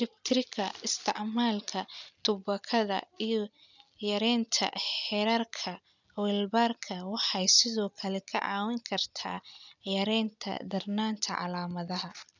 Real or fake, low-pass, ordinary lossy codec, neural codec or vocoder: real; 7.2 kHz; none; none